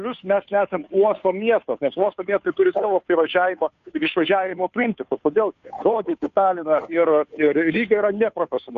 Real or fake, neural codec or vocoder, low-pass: fake; codec, 16 kHz, 2 kbps, FunCodec, trained on Chinese and English, 25 frames a second; 7.2 kHz